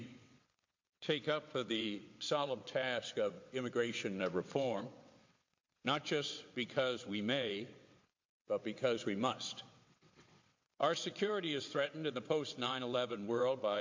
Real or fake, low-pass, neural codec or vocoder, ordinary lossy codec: fake; 7.2 kHz; vocoder, 22.05 kHz, 80 mel bands, WaveNeXt; MP3, 48 kbps